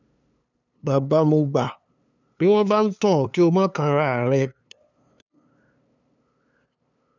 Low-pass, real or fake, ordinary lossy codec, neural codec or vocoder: 7.2 kHz; fake; none; codec, 16 kHz, 2 kbps, FunCodec, trained on LibriTTS, 25 frames a second